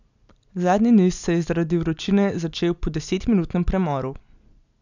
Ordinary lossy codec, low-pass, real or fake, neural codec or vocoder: none; 7.2 kHz; real; none